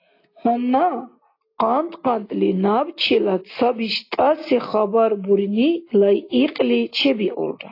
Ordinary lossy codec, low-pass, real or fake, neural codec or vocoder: AAC, 32 kbps; 5.4 kHz; real; none